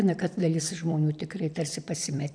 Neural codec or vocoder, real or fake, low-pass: vocoder, 44.1 kHz, 128 mel bands every 256 samples, BigVGAN v2; fake; 9.9 kHz